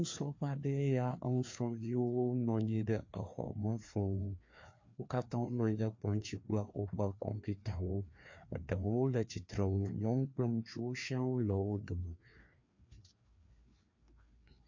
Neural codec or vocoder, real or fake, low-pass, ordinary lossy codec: codec, 16 kHz, 2 kbps, FreqCodec, larger model; fake; 7.2 kHz; MP3, 48 kbps